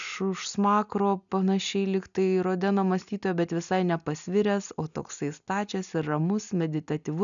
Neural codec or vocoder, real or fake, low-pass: none; real; 7.2 kHz